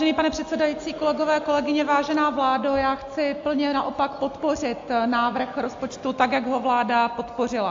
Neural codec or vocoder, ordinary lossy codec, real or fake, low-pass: none; AAC, 48 kbps; real; 7.2 kHz